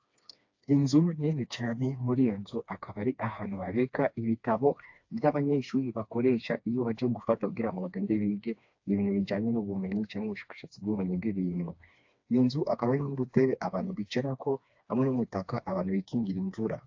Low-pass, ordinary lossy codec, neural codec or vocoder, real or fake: 7.2 kHz; AAC, 48 kbps; codec, 16 kHz, 2 kbps, FreqCodec, smaller model; fake